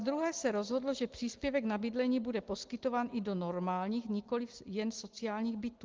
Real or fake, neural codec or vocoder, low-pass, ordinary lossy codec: real; none; 7.2 kHz; Opus, 16 kbps